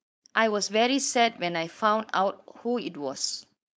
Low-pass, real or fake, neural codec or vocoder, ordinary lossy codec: none; fake; codec, 16 kHz, 4.8 kbps, FACodec; none